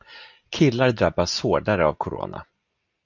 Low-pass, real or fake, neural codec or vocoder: 7.2 kHz; real; none